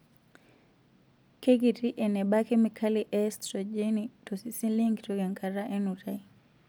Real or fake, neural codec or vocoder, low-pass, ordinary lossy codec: real; none; none; none